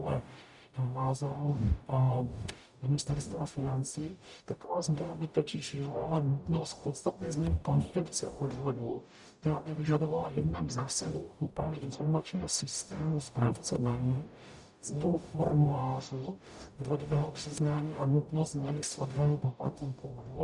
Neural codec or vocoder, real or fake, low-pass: codec, 44.1 kHz, 0.9 kbps, DAC; fake; 10.8 kHz